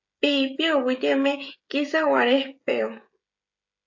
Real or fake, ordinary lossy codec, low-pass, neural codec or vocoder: fake; AAC, 48 kbps; 7.2 kHz; codec, 16 kHz, 16 kbps, FreqCodec, smaller model